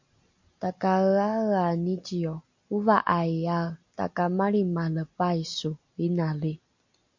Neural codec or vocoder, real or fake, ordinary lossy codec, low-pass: none; real; MP3, 48 kbps; 7.2 kHz